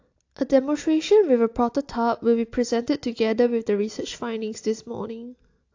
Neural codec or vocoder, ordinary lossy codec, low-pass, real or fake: none; AAC, 48 kbps; 7.2 kHz; real